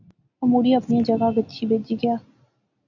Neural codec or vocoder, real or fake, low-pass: none; real; 7.2 kHz